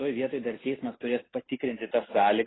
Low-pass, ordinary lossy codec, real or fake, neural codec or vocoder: 7.2 kHz; AAC, 16 kbps; real; none